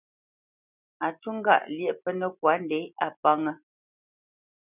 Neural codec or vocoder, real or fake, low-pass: none; real; 3.6 kHz